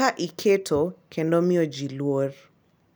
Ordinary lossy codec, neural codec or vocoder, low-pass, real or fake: none; none; none; real